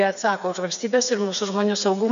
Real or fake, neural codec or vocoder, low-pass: fake; codec, 16 kHz, 4 kbps, FreqCodec, smaller model; 7.2 kHz